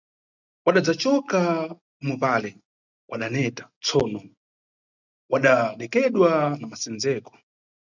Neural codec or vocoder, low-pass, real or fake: none; 7.2 kHz; real